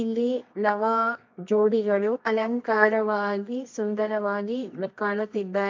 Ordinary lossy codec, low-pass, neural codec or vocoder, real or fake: MP3, 48 kbps; 7.2 kHz; codec, 24 kHz, 0.9 kbps, WavTokenizer, medium music audio release; fake